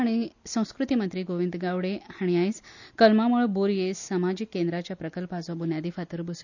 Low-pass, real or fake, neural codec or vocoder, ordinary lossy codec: 7.2 kHz; real; none; none